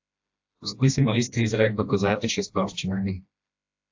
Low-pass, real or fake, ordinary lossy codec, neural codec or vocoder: 7.2 kHz; fake; AAC, 48 kbps; codec, 16 kHz, 1 kbps, FreqCodec, smaller model